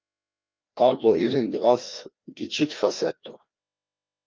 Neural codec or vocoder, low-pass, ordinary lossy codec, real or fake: codec, 16 kHz, 1 kbps, FreqCodec, larger model; 7.2 kHz; Opus, 24 kbps; fake